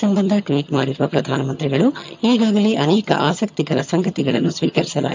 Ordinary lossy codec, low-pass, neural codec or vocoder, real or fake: MP3, 64 kbps; 7.2 kHz; vocoder, 22.05 kHz, 80 mel bands, HiFi-GAN; fake